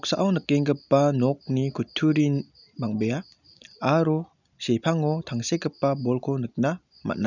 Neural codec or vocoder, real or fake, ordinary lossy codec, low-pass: none; real; none; 7.2 kHz